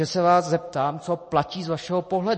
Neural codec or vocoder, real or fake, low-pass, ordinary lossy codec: none; real; 9.9 kHz; MP3, 32 kbps